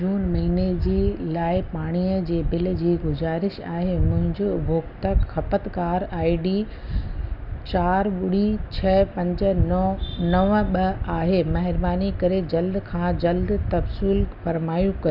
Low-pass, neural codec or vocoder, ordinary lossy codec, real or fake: 5.4 kHz; none; Opus, 64 kbps; real